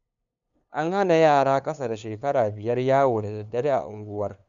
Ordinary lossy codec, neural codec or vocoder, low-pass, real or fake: none; codec, 16 kHz, 2 kbps, FunCodec, trained on LibriTTS, 25 frames a second; 7.2 kHz; fake